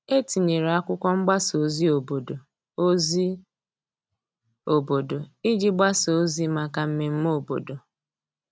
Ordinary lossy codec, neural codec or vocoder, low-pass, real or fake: none; none; none; real